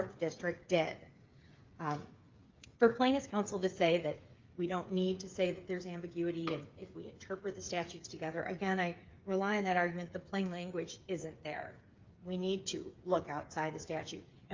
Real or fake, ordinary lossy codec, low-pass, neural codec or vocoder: fake; Opus, 24 kbps; 7.2 kHz; codec, 16 kHz, 8 kbps, FreqCodec, smaller model